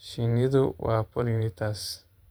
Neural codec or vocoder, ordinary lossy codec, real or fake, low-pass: vocoder, 44.1 kHz, 128 mel bands every 512 samples, BigVGAN v2; none; fake; none